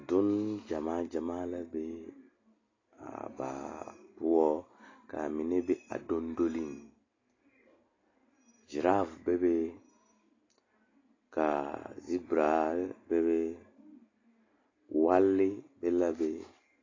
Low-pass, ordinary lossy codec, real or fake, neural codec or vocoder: 7.2 kHz; AAC, 32 kbps; real; none